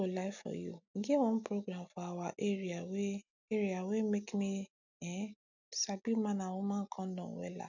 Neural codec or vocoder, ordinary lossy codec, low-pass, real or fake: none; none; 7.2 kHz; real